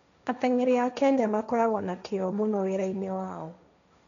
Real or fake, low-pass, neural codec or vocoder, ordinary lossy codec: fake; 7.2 kHz; codec, 16 kHz, 1.1 kbps, Voila-Tokenizer; none